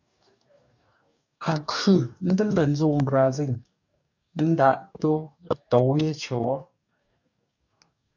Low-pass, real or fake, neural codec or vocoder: 7.2 kHz; fake; codec, 44.1 kHz, 2.6 kbps, DAC